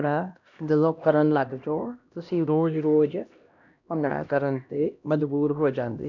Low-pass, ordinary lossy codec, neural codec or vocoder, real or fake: 7.2 kHz; none; codec, 16 kHz, 1 kbps, X-Codec, HuBERT features, trained on LibriSpeech; fake